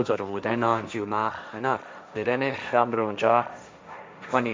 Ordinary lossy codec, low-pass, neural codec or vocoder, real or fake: none; none; codec, 16 kHz, 1.1 kbps, Voila-Tokenizer; fake